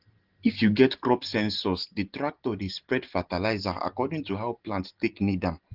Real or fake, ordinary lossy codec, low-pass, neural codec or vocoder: real; Opus, 16 kbps; 5.4 kHz; none